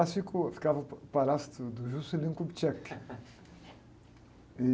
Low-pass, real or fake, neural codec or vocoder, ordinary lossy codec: none; real; none; none